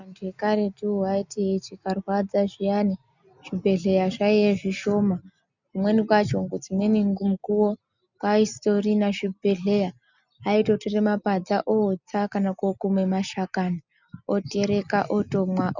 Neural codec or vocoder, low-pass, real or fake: none; 7.2 kHz; real